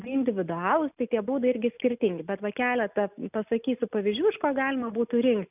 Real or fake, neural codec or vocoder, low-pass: real; none; 3.6 kHz